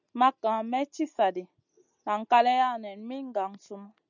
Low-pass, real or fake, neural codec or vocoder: 7.2 kHz; real; none